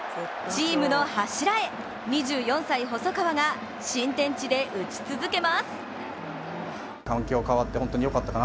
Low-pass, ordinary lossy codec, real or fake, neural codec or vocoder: none; none; real; none